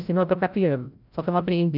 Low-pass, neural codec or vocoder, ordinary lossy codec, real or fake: 5.4 kHz; codec, 16 kHz, 0.5 kbps, FreqCodec, larger model; none; fake